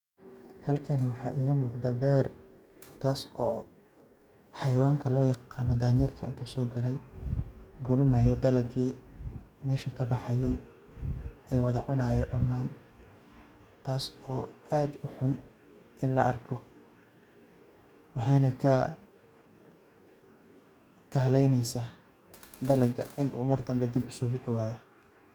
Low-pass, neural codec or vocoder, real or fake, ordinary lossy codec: 19.8 kHz; codec, 44.1 kHz, 2.6 kbps, DAC; fake; none